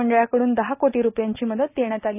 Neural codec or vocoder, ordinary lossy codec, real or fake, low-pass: none; none; real; 3.6 kHz